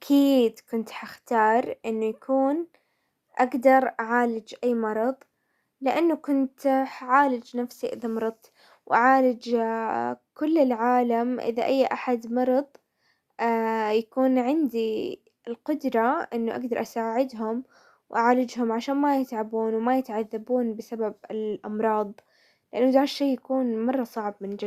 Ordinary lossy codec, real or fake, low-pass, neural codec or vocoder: Opus, 64 kbps; real; 14.4 kHz; none